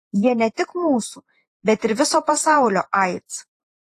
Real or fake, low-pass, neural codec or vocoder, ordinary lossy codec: fake; 14.4 kHz; vocoder, 48 kHz, 128 mel bands, Vocos; AAC, 48 kbps